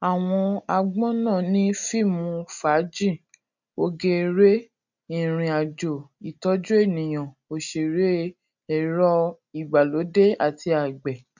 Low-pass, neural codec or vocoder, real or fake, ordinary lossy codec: 7.2 kHz; none; real; none